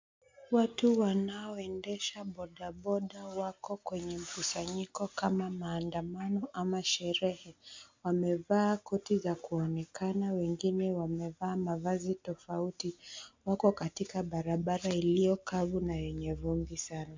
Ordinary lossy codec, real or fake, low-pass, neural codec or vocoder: MP3, 64 kbps; real; 7.2 kHz; none